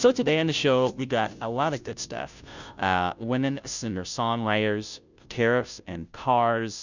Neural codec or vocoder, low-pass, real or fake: codec, 16 kHz, 0.5 kbps, FunCodec, trained on Chinese and English, 25 frames a second; 7.2 kHz; fake